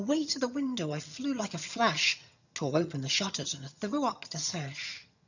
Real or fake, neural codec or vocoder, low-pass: fake; vocoder, 22.05 kHz, 80 mel bands, HiFi-GAN; 7.2 kHz